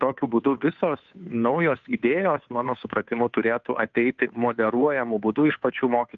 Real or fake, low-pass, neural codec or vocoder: fake; 7.2 kHz; codec, 16 kHz, 2 kbps, FunCodec, trained on Chinese and English, 25 frames a second